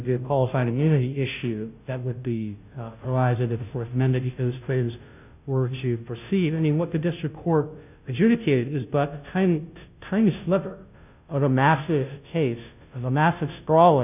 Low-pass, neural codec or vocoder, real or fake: 3.6 kHz; codec, 16 kHz, 0.5 kbps, FunCodec, trained on Chinese and English, 25 frames a second; fake